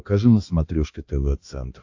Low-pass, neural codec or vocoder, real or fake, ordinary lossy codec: 7.2 kHz; codec, 16 kHz, 2 kbps, X-Codec, HuBERT features, trained on balanced general audio; fake; AAC, 48 kbps